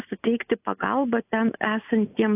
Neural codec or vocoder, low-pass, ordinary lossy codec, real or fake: none; 3.6 kHz; AAC, 32 kbps; real